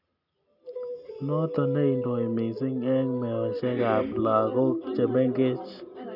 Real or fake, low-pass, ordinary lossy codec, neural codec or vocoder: real; 5.4 kHz; none; none